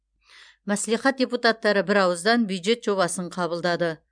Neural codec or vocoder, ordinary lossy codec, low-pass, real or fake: vocoder, 44.1 kHz, 128 mel bands every 256 samples, BigVGAN v2; MP3, 96 kbps; 9.9 kHz; fake